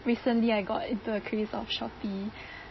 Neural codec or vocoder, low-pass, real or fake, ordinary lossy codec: none; 7.2 kHz; real; MP3, 24 kbps